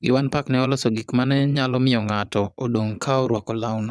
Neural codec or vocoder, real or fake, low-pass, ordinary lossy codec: vocoder, 22.05 kHz, 80 mel bands, Vocos; fake; none; none